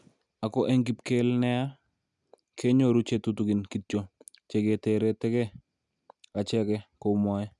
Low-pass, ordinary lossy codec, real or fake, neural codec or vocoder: 10.8 kHz; none; real; none